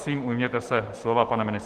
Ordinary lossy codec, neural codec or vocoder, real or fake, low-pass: Opus, 16 kbps; autoencoder, 48 kHz, 128 numbers a frame, DAC-VAE, trained on Japanese speech; fake; 14.4 kHz